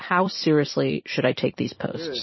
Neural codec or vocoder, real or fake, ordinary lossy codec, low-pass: vocoder, 44.1 kHz, 128 mel bands every 256 samples, BigVGAN v2; fake; MP3, 24 kbps; 7.2 kHz